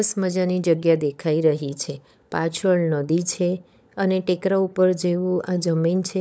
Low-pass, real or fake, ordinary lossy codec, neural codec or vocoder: none; fake; none; codec, 16 kHz, 16 kbps, FunCodec, trained on LibriTTS, 50 frames a second